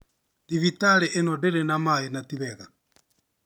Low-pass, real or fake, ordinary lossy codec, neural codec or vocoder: none; real; none; none